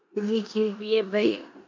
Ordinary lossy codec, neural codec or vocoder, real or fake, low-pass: MP3, 48 kbps; codec, 16 kHz in and 24 kHz out, 0.9 kbps, LongCat-Audio-Codec, four codebook decoder; fake; 7.2 kHz